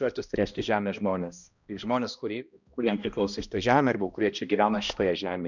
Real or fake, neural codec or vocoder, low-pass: fake; codec, 16 kHz, 1 kbps, X-Codec, HuBERT features, trained on balanced general audio; 7.2 kHz